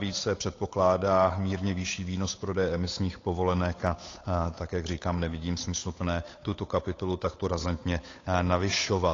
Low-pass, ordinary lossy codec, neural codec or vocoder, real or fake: 7.2 kHz; AAC, 32 kbps; codec, 16 kHz, 8 kbps, FunCodec, trained on Chinese and English, 25 frames a second; fake